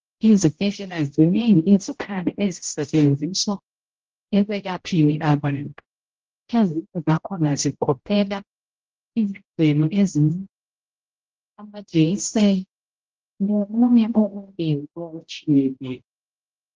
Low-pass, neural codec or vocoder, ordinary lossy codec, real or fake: 7.2 kHz; codec, 16 kHz, 0.5 kbps, X-Codec, HuBERT features, trained on general audio; Opus, 16 kbps; fake